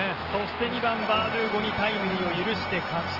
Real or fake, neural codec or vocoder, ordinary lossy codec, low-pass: real; none; Opus, 16 kbps; 5.4 kHz